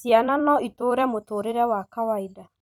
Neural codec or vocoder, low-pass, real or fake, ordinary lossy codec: vocoder, 44.1 kHz, 128 mel bands every 256 samples, BigVGAN v2; 19.8 kHz; fake; none